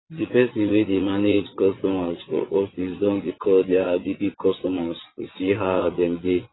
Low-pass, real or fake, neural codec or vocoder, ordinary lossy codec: 7.2 kHz; fake; vocoder, 22.05 kHz, 80 mel bands, WaveNeXt; AAC, 16 kbps